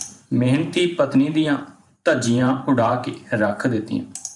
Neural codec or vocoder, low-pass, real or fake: vocoder, 44.1 kHz, 128 mel bands every 512 samples, BigVGAN v2; 10.8 kHz; fake